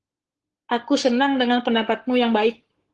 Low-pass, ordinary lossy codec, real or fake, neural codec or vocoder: 10.8 kHz; Opus, 16 kbps; fake; codec, 44.1 kHz, 7.8 kbps, Pupu-Codec